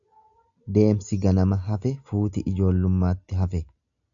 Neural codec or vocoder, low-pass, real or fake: none; 7.2 kHz; real